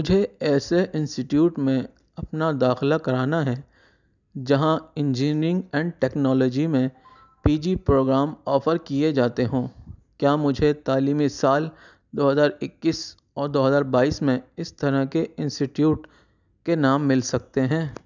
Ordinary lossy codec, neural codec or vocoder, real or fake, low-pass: none; none; real; 7.2 kHz